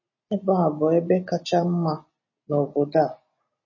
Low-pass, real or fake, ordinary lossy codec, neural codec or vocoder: 7.2 kHz; real; MP3, 32 kbps; none